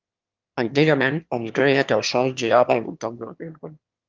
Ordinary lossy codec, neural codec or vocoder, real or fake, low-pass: Opus, 24 kbps; autoencoder, 22.05 kHz, a latent of 192 numbers a frame, VITS, trained on one speaker; fake; 7.2 kHz